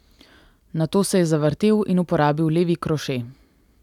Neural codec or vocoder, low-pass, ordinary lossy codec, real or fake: none; 19.8 kHz; none; real